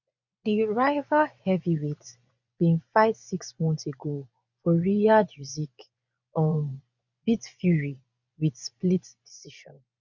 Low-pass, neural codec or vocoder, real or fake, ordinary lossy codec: 7.2 kHz; vocoder, 24 kHz, 100 mel bands, Vocos; fake; none